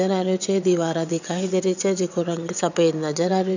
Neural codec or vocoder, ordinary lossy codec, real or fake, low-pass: vocoder, 22.05 kHz, 80 mel bands, WaveNeXt; none; fake; 7.2 kHz